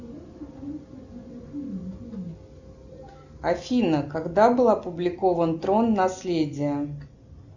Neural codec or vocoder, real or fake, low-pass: none; real; 7.2 kHz